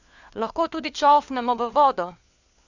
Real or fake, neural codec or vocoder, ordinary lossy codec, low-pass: fake; codec, 16 kHz, 4 kbps, FunCodec, trained on LibriTTS, 50 frames a second; Opus, 64 kbps; 7.2 kHz